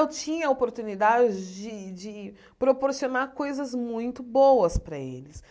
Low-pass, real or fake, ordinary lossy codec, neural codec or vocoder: none; real; none; none